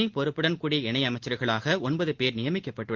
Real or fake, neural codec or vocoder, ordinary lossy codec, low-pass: real; none; Opus, 16 kbps; 7.2 kHz